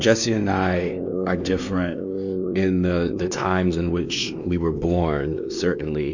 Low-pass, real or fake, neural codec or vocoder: 7.2 kHz; fake; codec, 16 kHz, 2 kbps, X-Codec, WavLM features, trained on Multilingual LibriSpeech